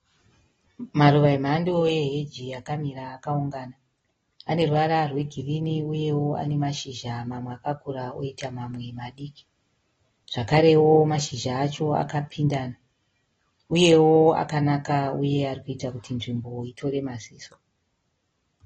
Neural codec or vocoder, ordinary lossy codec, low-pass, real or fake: none; AAC, 24 kbps; 19.8 kHz; real